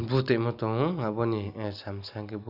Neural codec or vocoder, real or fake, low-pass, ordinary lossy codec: none; real; 5.4 kHz; none